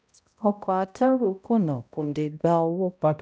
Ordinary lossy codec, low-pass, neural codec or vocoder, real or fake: none; none; codec, 16 kHz, 0.5 kbps, X-Codec, HuBERT features, trained on balanced general audio; fake